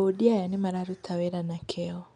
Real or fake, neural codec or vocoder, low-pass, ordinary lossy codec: real; none; 9.9 kHz; none